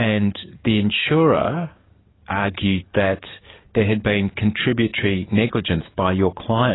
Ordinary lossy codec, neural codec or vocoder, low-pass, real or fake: AAC, 16 kbps; codec, 44.1 kHz, 7.8 kbps, DAC; 7.2 kHz; fake